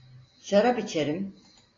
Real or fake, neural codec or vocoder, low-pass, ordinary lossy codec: real; none; 7.2 kHz; AAC, 32 kbps